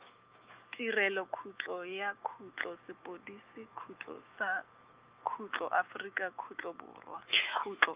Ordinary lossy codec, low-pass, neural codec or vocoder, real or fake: Opus, 24 kbps; 3.6 kHz; autoencoder, 48 kHz, 128 numbers a frame, DAC-VAE, trained on Japanese speech; fake